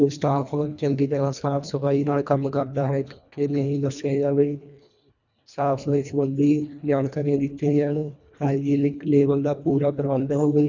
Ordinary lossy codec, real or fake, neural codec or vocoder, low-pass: none; fake; codec, 24 kHz, 1.5 kbps, HILCodec; 7.2 kHz